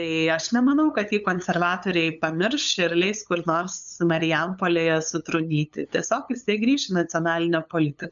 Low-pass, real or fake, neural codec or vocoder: 7.2 kHz; fake; codec, 16 kHz, 8 kbps, FunCodec, trained on LibriTTS, 25 frames a second